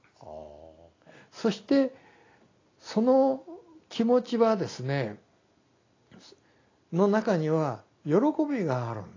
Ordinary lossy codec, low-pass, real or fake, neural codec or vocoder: AAC, 32 kbps; 7.2 kHz; real; none